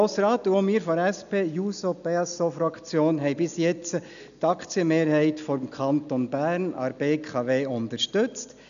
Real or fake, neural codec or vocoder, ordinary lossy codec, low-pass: real; none; none; 7.2 kHz